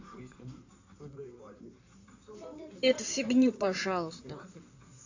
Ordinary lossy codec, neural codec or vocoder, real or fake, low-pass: AAC, 48 kbps; codec, 16 kHz in and 24 kHz out, 2.2 kbps, FireRedTTS-2 codec; fake; 7.2 kHz